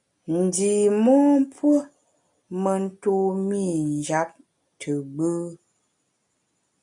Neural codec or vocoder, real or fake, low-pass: none; real; 10.8 kHz